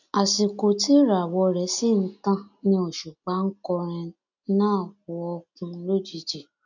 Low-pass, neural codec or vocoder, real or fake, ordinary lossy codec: 7.2 kHz; none; real; none